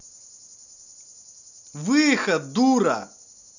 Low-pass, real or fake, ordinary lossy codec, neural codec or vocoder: 7.2 kHz; real; none; none